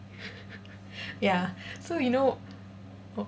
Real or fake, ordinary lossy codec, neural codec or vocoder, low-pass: real; none; none; none